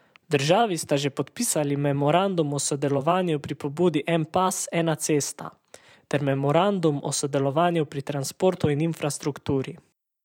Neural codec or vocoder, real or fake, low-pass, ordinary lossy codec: vocoder, 44.1 kHz, 128 mel bands every 512 samples, BigVGAN v2; fake; 19.8 kHz; none